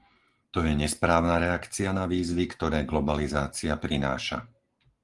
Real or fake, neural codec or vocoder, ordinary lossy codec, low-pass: real; none; Opus, 24 kbps; 10.8 kHz